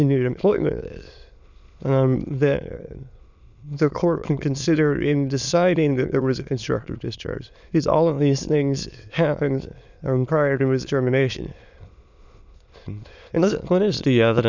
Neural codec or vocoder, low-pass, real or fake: autoencoder, 22.05 kHz, a latent of 192 numbers a frame, VITS, trained on many speakers; 7.2 kHz; fake